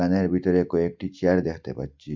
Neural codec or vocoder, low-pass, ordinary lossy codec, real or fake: none; 7.2 kHz; MP3, 64 kbps; real